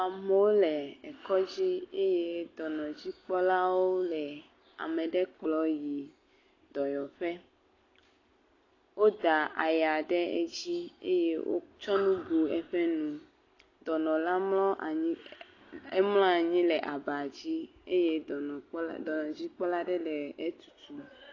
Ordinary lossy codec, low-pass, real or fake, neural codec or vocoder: AAC, 32 kbps; 7.2 kHz; real; none